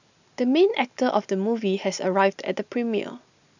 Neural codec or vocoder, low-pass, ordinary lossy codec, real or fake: none; 7.2 kHz; none; real